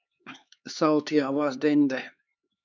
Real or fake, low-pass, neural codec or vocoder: fake; 7.2 kHz; codec, 16 kHz, 4 kbps, X-Codec, HuBERT features, trained on LibriSpeech